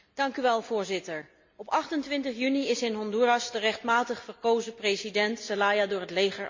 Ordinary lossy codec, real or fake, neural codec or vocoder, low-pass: MP3, 48 kbps; real; none; 7.2 kHz